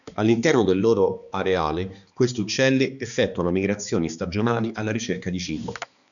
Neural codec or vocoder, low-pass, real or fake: codec, 16 kHz, 2 kbps, X-Codec, HuBERT features, trained on balanced general audio; 7.2 kHz; fake